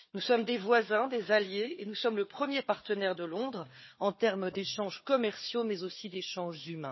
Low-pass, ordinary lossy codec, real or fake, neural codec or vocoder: 7.2 kHz; MP3, 24 kbps; fake; codec, 16 kHz, 4 kbps, FunCodec, trained on Chinese and English, 50 frames a second